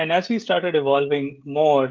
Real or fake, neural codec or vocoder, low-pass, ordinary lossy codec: fake; codec, 16 kHz, 16 kbps, FreqCodec, smaller model; 7.2 kHz; Opus, 24 kbps